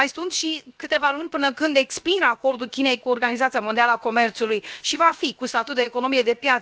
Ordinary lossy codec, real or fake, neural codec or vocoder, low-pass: none; fake; codec, 16 kHz, 0.7 kbps, FocalCodec; none